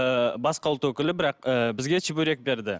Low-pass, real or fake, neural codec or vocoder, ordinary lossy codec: none; real; none; none